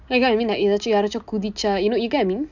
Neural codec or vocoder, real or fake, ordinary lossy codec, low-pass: none; real; none; 7.2 kHz